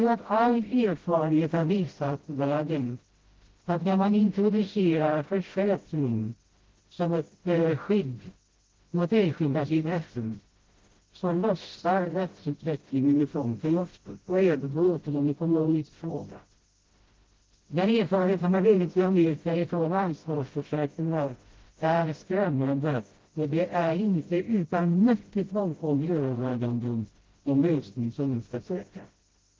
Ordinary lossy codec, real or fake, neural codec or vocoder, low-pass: Opus, 16 kbps; fake; codec, 16 kHz, 0.5 kbps, FreqCodec, smaller model; 7.2 kHz